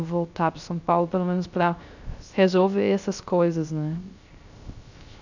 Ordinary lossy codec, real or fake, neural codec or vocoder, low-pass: none; fake; codec, 16 kHz, 0.3 kbps, FocalCodec; 7.2 kHz